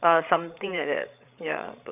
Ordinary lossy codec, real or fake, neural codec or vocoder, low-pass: none; fake; codec, 16 kHz, 8 kbps, FreqCodec, larger model; 3.6 kHz